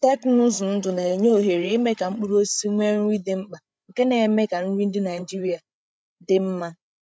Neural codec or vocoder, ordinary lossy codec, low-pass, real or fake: codec, 16 kHz, 8 kbps, FreqCodec, larger model; none; none; fake